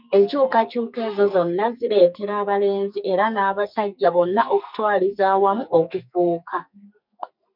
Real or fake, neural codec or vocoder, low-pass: fake; codec, 44.1 kHz, 2.6 kbps, SNAC; 5.4 kHz